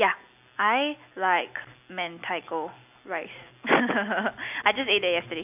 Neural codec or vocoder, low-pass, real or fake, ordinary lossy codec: none; 3.6 kHz; real; none